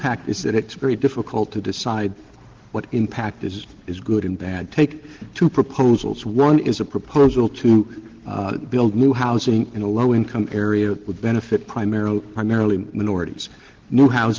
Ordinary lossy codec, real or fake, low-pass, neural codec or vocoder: Opus, 16 kbps; real; 7.2 kHz; none